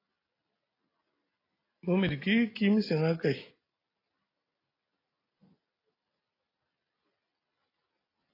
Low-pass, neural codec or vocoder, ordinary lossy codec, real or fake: 5.4 kHz; none; AAC, 24 kbps; real